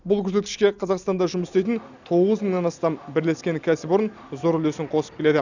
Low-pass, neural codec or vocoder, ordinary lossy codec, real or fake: 7.2 kHz; none; none; real